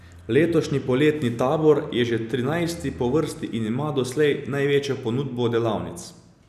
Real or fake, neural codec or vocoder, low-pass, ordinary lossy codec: real; none; 14.4 kHz; none